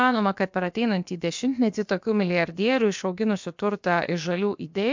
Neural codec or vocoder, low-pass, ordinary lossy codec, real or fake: codec, 16 kHz, about 1 kbps, DyCAST, with the encoder's durations; 7.2 kHz; MP3, 64 kbps; fake